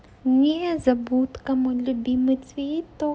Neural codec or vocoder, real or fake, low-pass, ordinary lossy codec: none; real; none; none